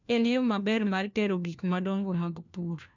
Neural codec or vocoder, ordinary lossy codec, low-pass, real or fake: codec, 16 kHz, 1 kbps, FunCodec, trained on LibriTTS, 50 frames a second; none; 7.2 kHz; fake